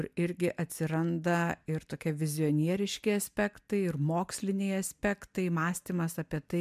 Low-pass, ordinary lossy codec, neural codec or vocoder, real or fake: 14.4 kHz; MP3, 96 kbps; none; real